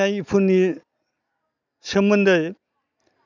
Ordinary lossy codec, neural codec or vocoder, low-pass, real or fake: none; none; 7.2 kHz; real